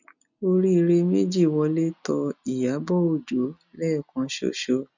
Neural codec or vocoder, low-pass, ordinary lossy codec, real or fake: none; 7.2 kHz; none; real